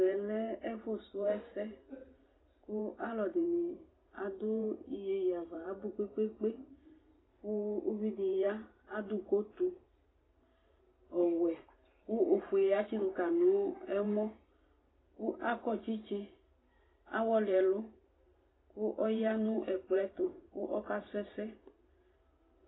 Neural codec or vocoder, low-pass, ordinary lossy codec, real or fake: vocoder, 44.1 kHz, 128 mel bands every 512 samples, BigVGAN v2; 7.2 kHz; AAC, 16 kbps; fake